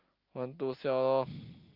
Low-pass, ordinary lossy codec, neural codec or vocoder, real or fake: 5.4 kHz; Opus, 24 kbps; none; real